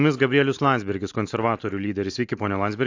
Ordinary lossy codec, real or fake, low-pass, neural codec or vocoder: AAC, 48 kbps; real; 7.2 kHz; none